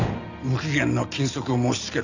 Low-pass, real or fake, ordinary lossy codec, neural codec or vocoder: 7.2 kHz; real; none; none